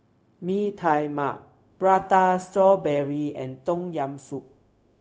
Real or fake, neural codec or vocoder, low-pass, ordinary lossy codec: fake; codec, 16 kHz, 0.4 kbps, LongCat-Audio-Codec; none; none